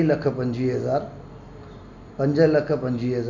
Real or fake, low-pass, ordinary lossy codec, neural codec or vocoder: real; 7.2 kHz; none; none